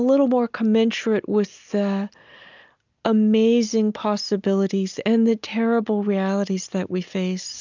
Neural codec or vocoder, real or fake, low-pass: none; real; 7.2 kHz